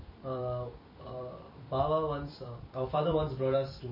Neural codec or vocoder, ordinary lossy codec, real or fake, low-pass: vocoder, 44.1 kHz, 128 mel bands every 256 samples, BigVGAN v2; MP3, 24 kbps; fake; 5.4 kHz